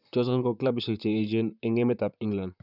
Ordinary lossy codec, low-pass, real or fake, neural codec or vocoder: none; 5.4 kHz; fake; vocoder, 44.1 kHz, 128 mel bands, Pupu-Vocoder